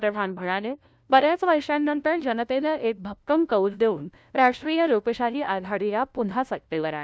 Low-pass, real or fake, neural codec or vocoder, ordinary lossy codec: none; fake; codec, 16 kHz, 0.5 kbps, FunCodec, trained on LibriTTS, 25 frames a second; none